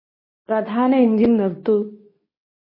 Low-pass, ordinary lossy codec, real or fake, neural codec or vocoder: 5.4 kHz; MP3, 24 kbps; fake; codec, 24 kHz, 0.9 kbps, WavTokenizer, medium speech release version 2